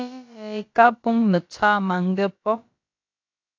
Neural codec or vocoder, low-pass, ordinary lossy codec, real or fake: codec, 16 kHz, about 1 kbps, DyCAST, with the encoder's durations; 7.2 kHz; AAC, 48 kbps; fake